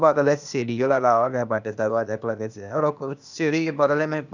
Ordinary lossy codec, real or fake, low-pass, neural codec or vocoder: none; fake; 7.2 kHz; codec, 16 kHz, 0.8 kbps, ZipCodec